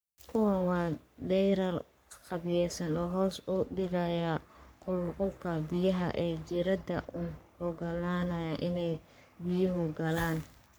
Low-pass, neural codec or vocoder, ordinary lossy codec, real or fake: none; codec, 44.1 kHz, 3.4 kbps, Pupu-Codec; none; fake